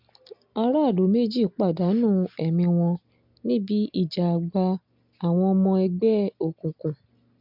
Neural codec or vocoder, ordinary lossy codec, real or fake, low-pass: none; MP3, 48 kbps; real; 5.4 kHz